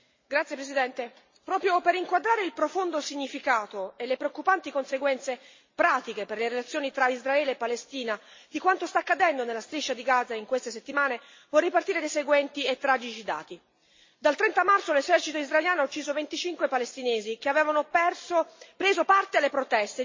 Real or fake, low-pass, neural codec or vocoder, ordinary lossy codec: real; 7.2 kHz; none; MP3, 32 kbps